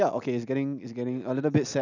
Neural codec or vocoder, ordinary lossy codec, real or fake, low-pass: none; none; real; 7.2 kHz